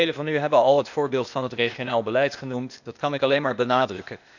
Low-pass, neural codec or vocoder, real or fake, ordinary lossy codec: 7.2 kHz; codec, 16 kHz, 0.8 kbps, ZipCodec; fake; none